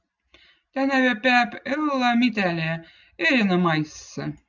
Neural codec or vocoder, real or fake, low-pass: none; real; 7.2 kHz